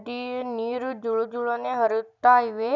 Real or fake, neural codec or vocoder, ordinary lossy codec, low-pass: real; none; none; 7.2 kHz